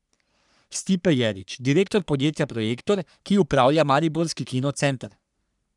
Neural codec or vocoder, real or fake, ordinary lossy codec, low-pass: codec, 44.1 kHz, 3.4 kbps, Pupu-Codec; fake; none; 10.8 kHz